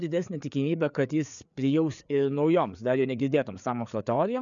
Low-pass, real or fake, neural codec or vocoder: 7.2 kHz; fake; codec, 16 kHz, 4 kbps, FreqCodec, larger model